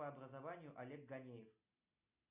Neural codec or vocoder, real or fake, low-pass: none; real; 3.6 kHz